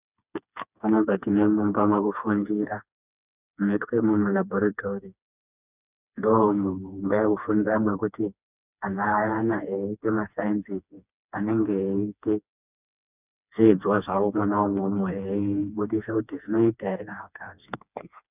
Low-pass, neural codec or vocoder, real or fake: 3.6 kHz; codec, 16 kHz, 2 kbps, FreqCodec, smaller model; fake